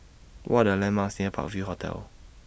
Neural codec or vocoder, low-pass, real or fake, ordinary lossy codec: none; none; real; none